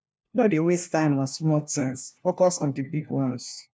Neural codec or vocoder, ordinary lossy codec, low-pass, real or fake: codec, 16 kHz, 1 kbps, FunCodec, trained on LibriTTS, 50 frames a second; none; none; fake